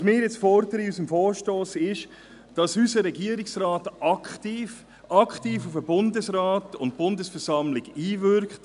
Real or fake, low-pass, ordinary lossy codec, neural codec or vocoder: real; 10.8 kHz; none; none